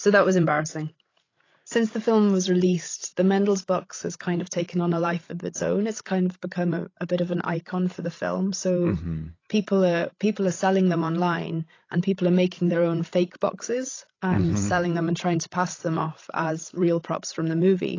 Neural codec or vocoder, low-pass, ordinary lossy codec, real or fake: codec, 16 kHz, 16 kbps, FreqCodec, larger model; 7.2 kHz; AAC, 32 kbps; fake